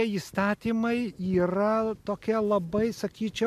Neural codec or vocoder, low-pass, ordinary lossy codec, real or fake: none; 14.4 kHz; MP3, 96 kbps; real